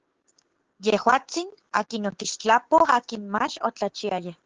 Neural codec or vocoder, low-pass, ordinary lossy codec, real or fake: codec, 16 kHz, 6 kbps, DAC; 7.2 kHz; Opus, 16 kbps; fake